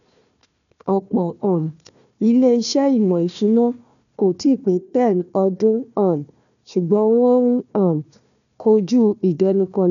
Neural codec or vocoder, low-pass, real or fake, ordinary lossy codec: codec, 16 kHz, 1 kbps, FunCodec, trained on Chinese and English, 50 frames a second; 7.2 kHz; fake; none